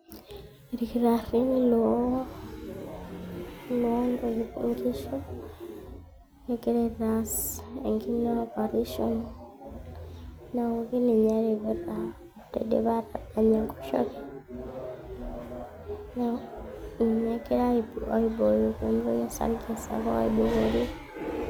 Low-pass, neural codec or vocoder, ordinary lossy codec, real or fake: none; none; none; real